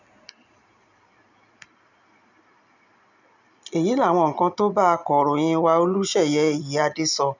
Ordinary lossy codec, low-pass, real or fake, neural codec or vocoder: none; 7.2 kHz; real; none